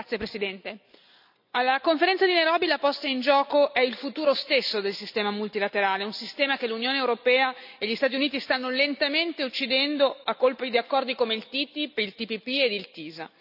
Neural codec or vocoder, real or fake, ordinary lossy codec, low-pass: none; real; none; 5.4 kHz